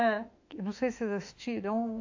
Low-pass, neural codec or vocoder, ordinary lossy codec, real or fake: 7.2 kHz; autoencoder, 48 kHz, 32 numbers a frame, DAC-VAE, trained on Japanese speech; AAC, 48 kbps; fake